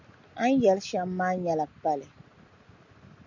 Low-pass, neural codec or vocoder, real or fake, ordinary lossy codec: 7.2 kHz; none; real; MP3, 64 kbps